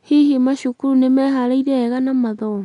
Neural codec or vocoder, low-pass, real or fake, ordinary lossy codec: none; 10.8 kHz; real; none